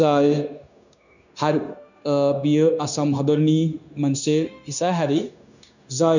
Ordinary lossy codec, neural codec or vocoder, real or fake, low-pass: none; codec, 16 kHz, 0.9 kbps, LongCat-Audio-Codec; fake; 7.2 kHz